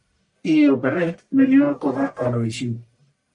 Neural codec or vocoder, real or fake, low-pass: codec, 44.1 kHz, 1.7 kbps, Pupu-Codec; fake; 10.8 kHz